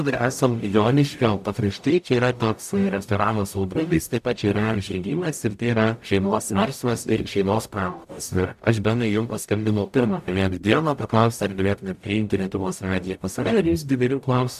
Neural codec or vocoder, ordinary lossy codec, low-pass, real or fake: codec, 44.1 kHz, 0.9 kbps, DAC; AAC, 96 kbps; 14.4 kHz; fake